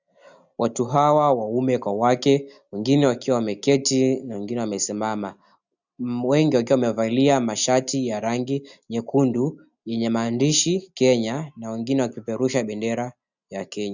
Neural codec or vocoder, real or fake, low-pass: none; real; 7.2 kHz